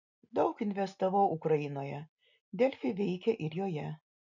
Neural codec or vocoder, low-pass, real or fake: none; 7.2 kHz; real